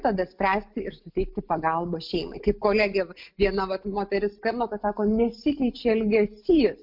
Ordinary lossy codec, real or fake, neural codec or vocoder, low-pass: MP3, 48 kbps; real; none; 5.4 kHz